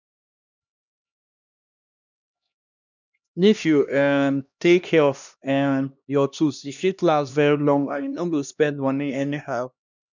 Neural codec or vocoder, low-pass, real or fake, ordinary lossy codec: codec, 16 kHz, 1 kbps, X-Codec, HuBERT features, trained on LibriSpeech; 7.2 kHz; fake; none